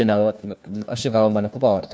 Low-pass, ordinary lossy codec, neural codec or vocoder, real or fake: none; none; codec, 16 kHz, 1 kbps, FunCodec, trained on LibriTTS, 50 frames a second; fake